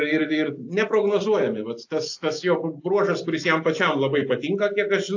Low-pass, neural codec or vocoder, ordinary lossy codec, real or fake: 7.2 kHz; none; AAC, 48 kbps; real